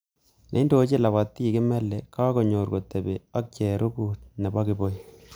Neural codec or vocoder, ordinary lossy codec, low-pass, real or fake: none; none; none; real